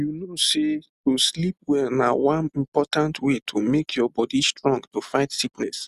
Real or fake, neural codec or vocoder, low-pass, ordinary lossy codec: real; none; 14.4 kHz; Opus, 64 kbps